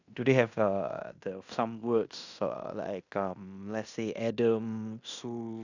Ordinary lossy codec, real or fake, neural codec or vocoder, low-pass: none; fake; codec, 16 kHz in and 24 kHz out, 0.9 kbps, LongCat-Audio-Codec, fine tuned four codebook decoder; 7.2 kHz